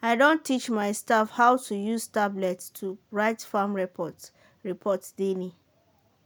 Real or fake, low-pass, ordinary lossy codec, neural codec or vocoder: real; none; none; none